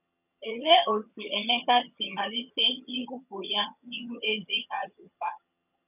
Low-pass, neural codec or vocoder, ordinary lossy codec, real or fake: 3.6 kHz; vocoder, 22.05 kHz, 80 mel bands, HiFi-GAN; none; fake